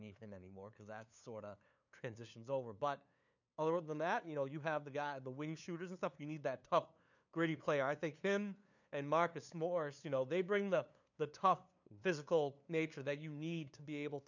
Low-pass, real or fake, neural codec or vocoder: 7.2 kHz; fake; codec, 16 kHz, 2 kbps, FunCodec, trained on LibriTTS, 25 frames a second